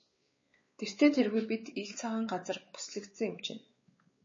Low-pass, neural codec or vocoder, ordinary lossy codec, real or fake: 7.2 kHz; codec, 16 kHz, 4 kbps, X-Codec, WavLM features, trained on Multilingual LibriSpeech; MP3, 32 kbps; fake